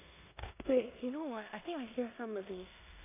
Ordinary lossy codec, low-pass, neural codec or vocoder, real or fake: AAC, 24 kbps; 3.6 kHz; codec, 16 kHz in and 24 kHz out, 0.9 kbps, LongCat-Audio-Codec, four codebook decoder; fake